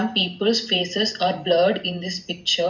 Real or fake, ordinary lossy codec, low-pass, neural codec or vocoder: real; none; 7.2 kHz; none